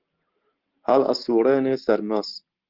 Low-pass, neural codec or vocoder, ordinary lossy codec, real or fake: 5.4 kHz; none; Opus, 16 kbps; real